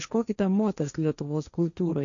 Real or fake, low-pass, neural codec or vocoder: fake; 7.2 kHz; codec, 16 kHz, 1.1 kbps, Voila-Tokenizer